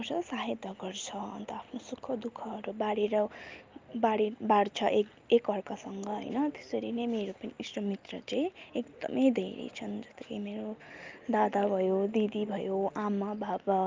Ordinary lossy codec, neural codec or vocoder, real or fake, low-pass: Opus, 24 kbps; none; real; 7.2 kHz